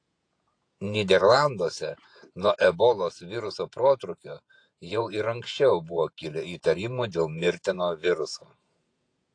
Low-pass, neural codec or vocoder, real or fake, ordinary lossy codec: 9.9 kHz; vocoder, 48 kHz, 128 mel bands, Vocos; fake; AAC, 48 kbps